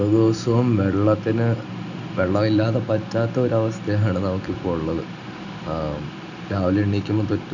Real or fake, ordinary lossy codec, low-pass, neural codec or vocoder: real; none; 7.2 kHz; none